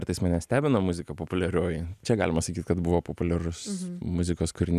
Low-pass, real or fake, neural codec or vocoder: 14.4 kHz; real; none